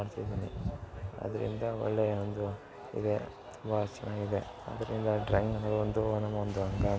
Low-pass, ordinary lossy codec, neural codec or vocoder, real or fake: none; none; none; real